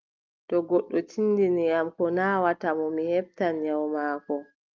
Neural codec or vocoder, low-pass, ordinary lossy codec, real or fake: none; 7.2 kHz; Opus, 24 kbps; real